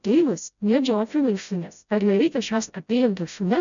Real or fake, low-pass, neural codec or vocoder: fake; 7.2 kHz; codec, 16 kHz, 0.5 kbps, FreqCodec, smaller model